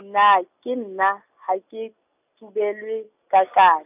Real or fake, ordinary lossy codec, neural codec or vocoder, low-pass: real; none; none; 3.6 kHz